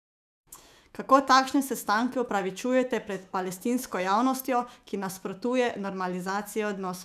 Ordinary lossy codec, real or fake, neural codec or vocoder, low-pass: none; fake; autoencoder, 48 kHz, 128 numbers a frame, DAC-VAE, trained on Japanese speech; 14.4 kHz